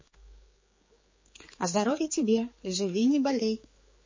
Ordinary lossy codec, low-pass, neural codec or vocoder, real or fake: MP3, 32 kbps; 7.2 kHz; codec, 16 kHz, 4 kbps, X-Codec, HuBERT features, trained on general audio; fake